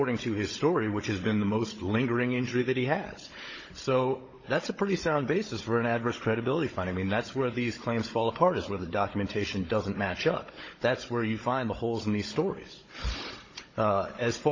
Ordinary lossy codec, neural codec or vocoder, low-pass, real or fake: MP3, 32 kbps; codec, 16 kHz, 16 kbps, FunCodec, trained on Chinese and English, 50 frames a second; 7.2 kHz; fake